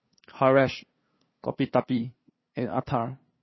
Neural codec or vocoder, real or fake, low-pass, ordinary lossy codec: codec, 16 kHz, 2 kbps, FunCodec, trained on LibriTTS, 25 frames a second; fake; 7.2 kHz; MP3, 24 kbps